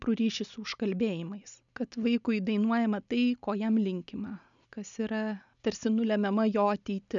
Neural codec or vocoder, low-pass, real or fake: none; 7.2 kHz; real